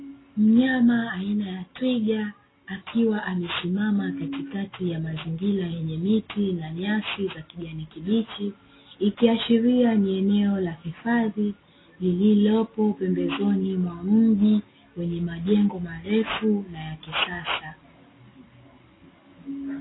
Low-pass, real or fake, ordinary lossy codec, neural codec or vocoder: 7.2 kHz; real; AAC, 16 kbps; none